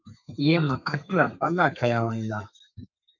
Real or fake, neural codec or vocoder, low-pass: fake; codec, 32 kHz, 1.9 kbps, SNAC; 7.2 kHz